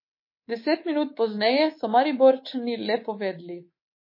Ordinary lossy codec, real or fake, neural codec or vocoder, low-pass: MP3, 24 kbps; fake; codec, 24 kHz, 3.1 kbps, DualCodec; 5.4 kHz